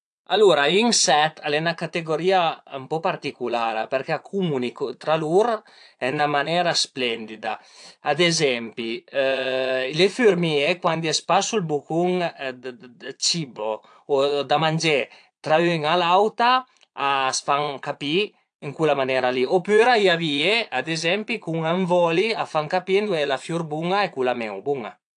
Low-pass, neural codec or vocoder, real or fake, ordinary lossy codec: 9.9 kHz; vocoder, 22.05 kHz, 80 mel bands, Vocos; fake; AAC, 64 kbps